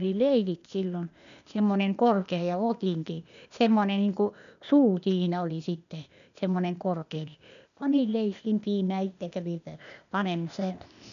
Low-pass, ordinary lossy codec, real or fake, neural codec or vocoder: 7.2 kHz; none; fake; codec, 16 kHz, 0.8 kbps, ZipCodec